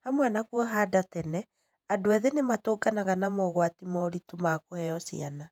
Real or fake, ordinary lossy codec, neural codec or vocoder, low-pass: fake; none; vocoder, 48 kHz, 128 mel bands, Vocos; 19.8 kHz